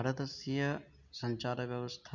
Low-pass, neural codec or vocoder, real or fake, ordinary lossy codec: 7.2 kHz; none; real; none